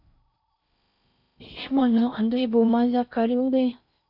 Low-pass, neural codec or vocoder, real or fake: 5.4 kHz; codec, 16 kHz in and 24 kHz out, 0.6 kbps, FocalCodec, streaming, 4096 codes; fake